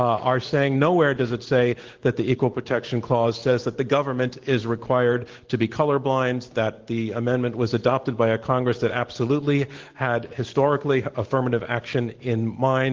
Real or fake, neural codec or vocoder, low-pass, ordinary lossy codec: real; none; 7.2 kHz; Opus, 16 kbps